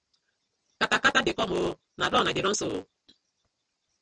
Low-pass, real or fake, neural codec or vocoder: 9.9 kHz; real; none